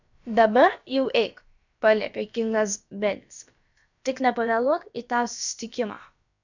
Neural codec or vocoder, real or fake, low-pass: codec, 16 kHz, about 1 kbps, DyCAST, with the encoder's durations; fake; 7.2 kHz